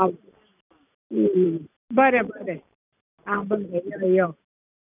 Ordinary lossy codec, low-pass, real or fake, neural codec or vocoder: none; 3.6 kHz; real; none